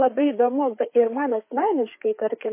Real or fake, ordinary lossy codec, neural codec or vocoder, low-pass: fake; MP3, 24 kbps; codec, 16 kHz, 4 kbps, FreqCodec, larger model; 3.6 kHz